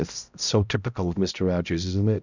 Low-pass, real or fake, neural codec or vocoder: 7.2 kHz; fake; codec, 16 kHz, 0.5 kbps, X-Codec, HuBERT features, trained on balanced general audio